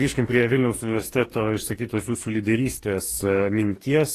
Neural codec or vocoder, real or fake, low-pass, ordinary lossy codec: codec, 44.1 kHz, 2.6 kbps, DAC; fake; 14.4 kHz; AAC, 48 kbps